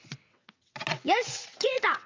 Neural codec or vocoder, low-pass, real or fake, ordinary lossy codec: vocoder, 22.05 kHz, 80 mel bands, WaveNeXt; 7.2 kHz; fake; MP3, 64 kbps